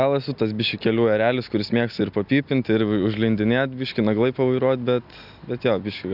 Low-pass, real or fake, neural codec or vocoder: 5.4 kHz; real; none